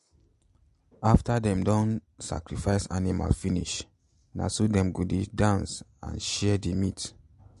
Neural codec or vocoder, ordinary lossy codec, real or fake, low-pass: none; MP3, 48 kbps; real; 14.4 kHz